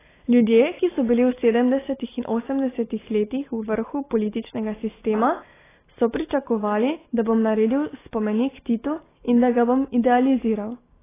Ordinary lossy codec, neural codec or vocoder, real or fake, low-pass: AAC, 16 kbps; none; real; 3.6 kHz